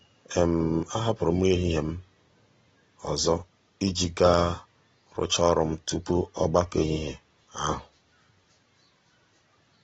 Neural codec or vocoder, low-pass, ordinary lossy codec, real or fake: none; 10.8 kHz; AAC, 24 kbps; real